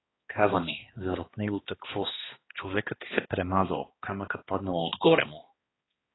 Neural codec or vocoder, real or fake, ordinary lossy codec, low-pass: codec, 16 kHz, 2 kbps, X-Codec, HuBERT features, trained on balanced general audio; fake; AAC, 16 kbps; 7.2 kHz